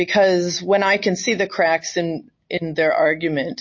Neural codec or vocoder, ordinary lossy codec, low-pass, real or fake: none; MP3, 32 kbps; 7.2 kHz; real